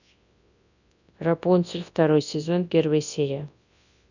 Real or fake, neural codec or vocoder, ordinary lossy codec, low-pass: fake; codec, 24 kHz, 0.9 kbps, WavTokenizer, large speech release; none; 7.2 kHz